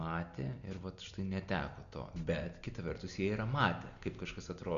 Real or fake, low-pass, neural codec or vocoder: real; 7.2 kHz; none